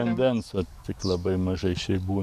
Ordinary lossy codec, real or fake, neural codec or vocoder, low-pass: MP3, 96 kbps; fake; codec, 44.1 kHz, 7.8 kbps, DAC; 14.4 kHz